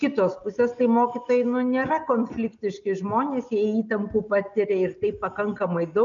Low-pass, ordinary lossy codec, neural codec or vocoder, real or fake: 7.2 kHz; MP3, 96 kbps; none; real